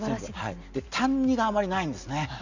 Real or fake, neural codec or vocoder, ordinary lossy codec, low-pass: real; none; none; 7.2 kHz